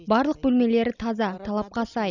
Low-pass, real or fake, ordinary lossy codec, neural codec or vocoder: 7.2 kHz; real; none; none